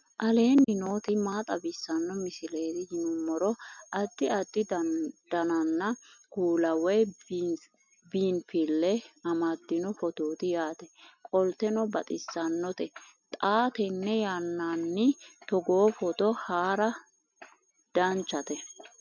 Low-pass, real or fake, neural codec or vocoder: 7.2 kHz; real; none